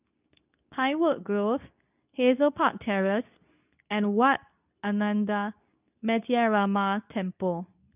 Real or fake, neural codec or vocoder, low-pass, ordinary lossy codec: fake; codec, 24 kHz, 0.9 kbps, WavTokenizer, medium speech release version 2; 3.6 kHz; none